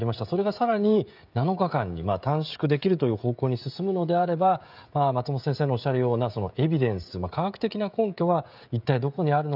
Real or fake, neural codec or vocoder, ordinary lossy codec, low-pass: fake; codec, 16 kHz, 16 kbps, FreqCodec, smaller model; none; 5.4 kHz